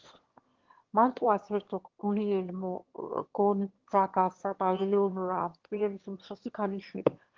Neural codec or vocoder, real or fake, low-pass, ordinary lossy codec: autoencoder, 22.05 kHz, a latent of 192 numbers a frame, VITS, trained on one speaker; fake; 7.2 kHz; Opus, 16 kbps